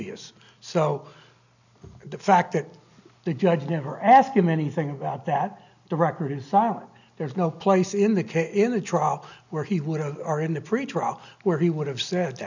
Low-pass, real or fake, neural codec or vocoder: 7.2 kHz; real; none